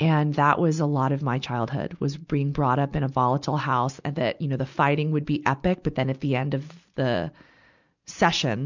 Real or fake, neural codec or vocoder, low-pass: real; none; 7.2 kHz